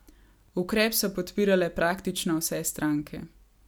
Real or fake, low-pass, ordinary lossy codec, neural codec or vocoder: real; none; none; none